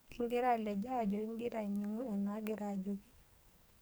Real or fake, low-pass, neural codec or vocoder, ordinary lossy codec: fake; none; codec, 44.1 kHz, 2.6 kbps, SNAC; none